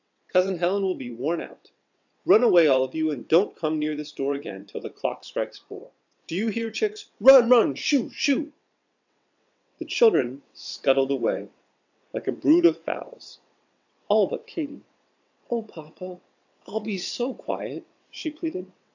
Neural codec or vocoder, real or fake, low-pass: vocoder, 22.05 kHz, 80 mel bands, WaveNeXt; fake; 7.2 kHz